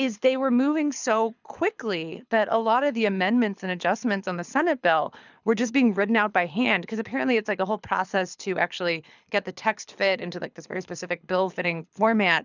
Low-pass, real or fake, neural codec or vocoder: 7.2 kHz; fake; codec, 24 kHz, 6 kbps, HILCodec